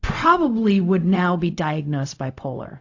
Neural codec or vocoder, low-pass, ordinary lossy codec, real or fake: codec, 16 kHz, 0.4 kbps, LongCat-Audio-Codec; 7.2 kHz; AAC, 48 kbps; fake